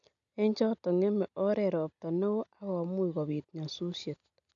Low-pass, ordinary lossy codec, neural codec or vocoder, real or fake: 7.2 kHz; none; none; real